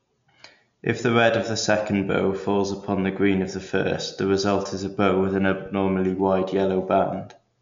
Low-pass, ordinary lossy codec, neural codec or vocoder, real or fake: 7.2 kHz; MP3, 64 kbps; none; real